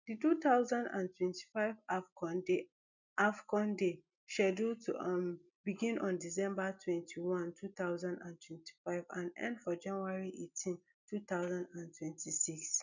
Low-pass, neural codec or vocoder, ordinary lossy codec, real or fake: 7.2 kHz; none; none; real